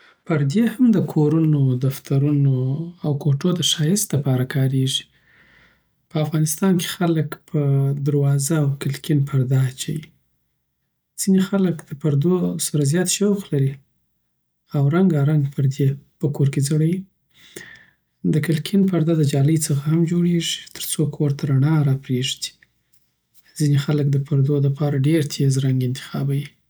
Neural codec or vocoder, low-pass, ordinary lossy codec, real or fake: none; none; none; real